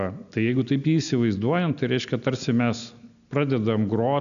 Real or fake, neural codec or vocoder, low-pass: real; none; 7.2 kHz